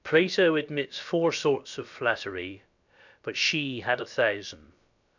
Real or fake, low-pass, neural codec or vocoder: fake; 7.2 kHz; codec, 16 kHz, about 1 kbps, DyCAST, with the encoder's durations